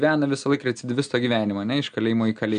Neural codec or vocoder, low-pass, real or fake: none; 9.9 kHz; real